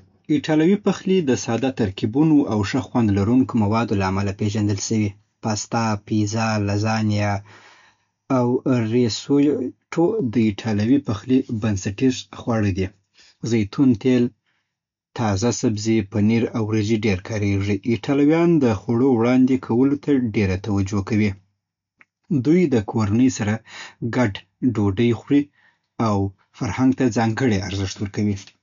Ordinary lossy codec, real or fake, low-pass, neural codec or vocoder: MP3, 64 kbps; real; 7.2 kHz; none